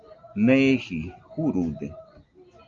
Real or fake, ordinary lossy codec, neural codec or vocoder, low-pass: real; Opus, 32 kbps; none; 7.2 kHz